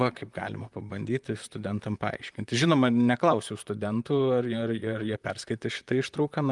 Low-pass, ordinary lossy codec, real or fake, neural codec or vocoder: 10.8 kHz; Opus, 32 kbps; fake; vocoder, 44.1 kHz, 128 mel bands, Pupu-Vocoder